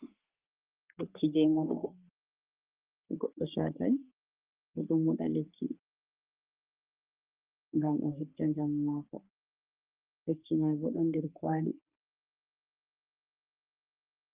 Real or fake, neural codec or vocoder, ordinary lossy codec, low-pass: fake; codec, 44.1 kHz, 3.4 kbps, Pupu-Codec; Opus, 24 kbps; 3.6 kHz